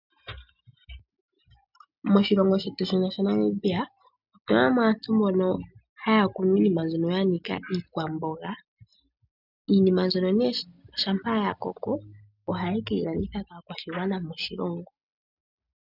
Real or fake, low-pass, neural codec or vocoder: real; 5.4 kHz; none